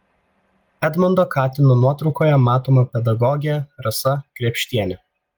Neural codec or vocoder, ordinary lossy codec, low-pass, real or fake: none; Opus, 24 kbps; 19.8 kHz; real